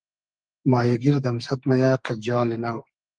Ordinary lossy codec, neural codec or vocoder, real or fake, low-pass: Opus, 32 kbps; codec, 32 kHz, 1.9 kbps, SNAC; fake; 9.9 kHz